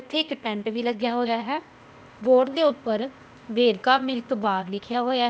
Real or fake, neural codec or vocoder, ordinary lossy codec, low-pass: fake; codec, 16 kHz, 0.8 kbps, ZipCodec; none; none